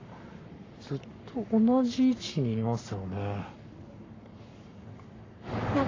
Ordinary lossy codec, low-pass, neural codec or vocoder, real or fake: AAC, 32 kbps; 7.2 kHz; codec, 44.1 kHz, 7.8 kbps, Pupu-Codec; fake